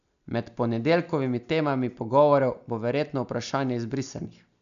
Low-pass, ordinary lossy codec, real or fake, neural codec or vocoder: 7.2 kHz; none; real; none